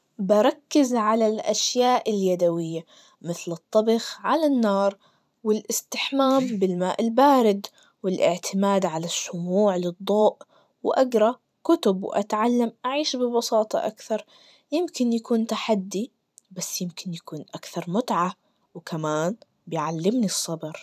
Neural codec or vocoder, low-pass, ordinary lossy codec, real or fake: vocoder, 44.1 kHz, 128 mel bands every 512 samples, BigVGAN v2; 14.4 kHz; none; fake